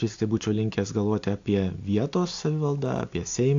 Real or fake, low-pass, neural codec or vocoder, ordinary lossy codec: real; 7.2 kHz; none; AAC, 48 kbps